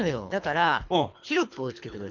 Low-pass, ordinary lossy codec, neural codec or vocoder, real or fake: 7.2 kHz; none; codec, 24 kHz, 3 kbps, HILCodec; fake